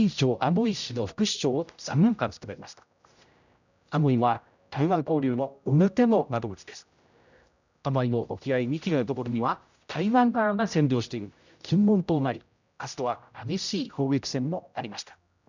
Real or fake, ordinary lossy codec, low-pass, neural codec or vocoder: fake; none; 7.2 kHz; codec, 16 kHz, 0.5 kbps, X-Codec, HuBERT features, trained on general audio